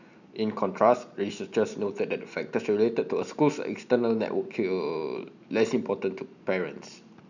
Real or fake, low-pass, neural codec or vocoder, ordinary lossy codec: real; 7.2 kHz; none; none